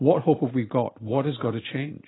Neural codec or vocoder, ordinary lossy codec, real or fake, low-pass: none; AAC, 16 kbps; real; 7.2 kHz